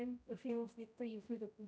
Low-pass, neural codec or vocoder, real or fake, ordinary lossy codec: none; codec, 16 kHz, 0.5 kbps, X-Codec, HuBERT features, trained on general audio; fake; none